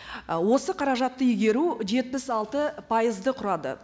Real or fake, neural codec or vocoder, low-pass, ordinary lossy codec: real; none; none; none